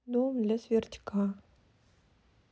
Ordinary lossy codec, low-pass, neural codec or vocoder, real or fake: none; none; none; real